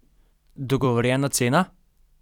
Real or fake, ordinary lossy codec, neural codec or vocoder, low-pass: fake; none; vocoder, 44.1 kHz, 128 mel bands every 256 samples, BigVGAN v2; 19.8 kHz